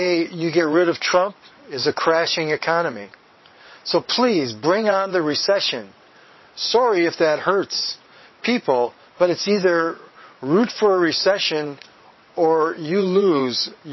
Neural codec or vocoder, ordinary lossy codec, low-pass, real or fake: vocoder, 22.05 kHz, 80 mel bands, WaveNeXt; MP3, 24 kbps; 7.2 kHz; fake